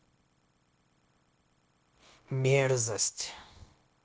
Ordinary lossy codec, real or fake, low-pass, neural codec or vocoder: none; fake; none; codec, 16 kHz, 0.9 kbps, LongCat-Audio-Codec